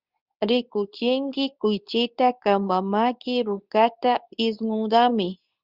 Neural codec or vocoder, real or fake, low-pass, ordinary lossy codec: codec, 24 kHz, 0.9 kbps, WavTokenizer, medium speech release version 2; fake; 5.4 kHz; Opus, 64 kbps